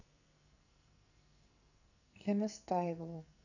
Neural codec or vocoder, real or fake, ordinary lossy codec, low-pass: codec, 44.1 kHz, 2.6 kbps, SNAC; fake; none; 7.2 kHz